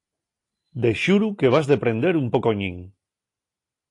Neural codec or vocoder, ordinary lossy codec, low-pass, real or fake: none; AAC, 48 kbps; 10.8 kHz; real